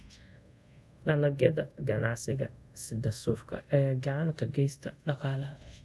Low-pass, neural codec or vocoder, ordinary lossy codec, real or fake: none; codec, 24 kHz, 0.5 kbps, DualCodec; none; fake